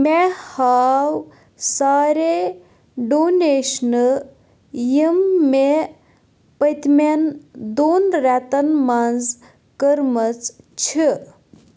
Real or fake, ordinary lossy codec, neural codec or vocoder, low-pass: real; none; none; none